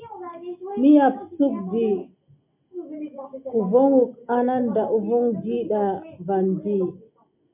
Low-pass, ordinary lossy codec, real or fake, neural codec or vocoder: 3.6 kHz; MP3, 32 kbps; real; none